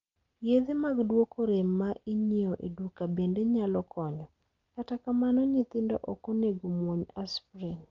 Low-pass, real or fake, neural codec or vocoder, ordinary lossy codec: 7.2 kHz; real; none; Opus, 32 kbps